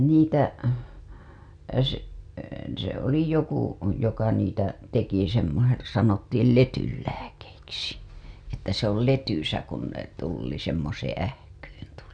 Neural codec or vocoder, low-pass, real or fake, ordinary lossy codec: none; 9.9 kHz; real; none